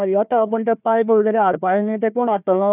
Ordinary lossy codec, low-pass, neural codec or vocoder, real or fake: none; 3.6 kHz; codec, 16 kHz, 1 kbps, FunCodec, trained on Chinese and English, 50 frames a second; fake